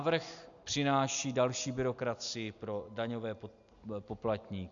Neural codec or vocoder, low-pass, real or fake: none; 7.2 kHz; real